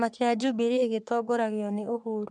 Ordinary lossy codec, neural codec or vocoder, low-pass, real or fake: none; codec, 44.1 kHz, 3.4 kbps, Pupu-Codec; 10.8 kHz; fake